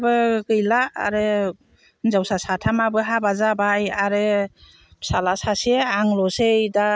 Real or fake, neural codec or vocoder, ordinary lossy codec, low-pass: real; none; none; none